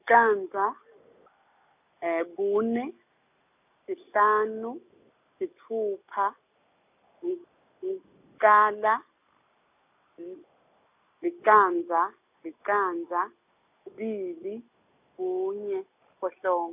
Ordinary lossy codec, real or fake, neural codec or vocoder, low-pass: none; real; none; 3.6 kHz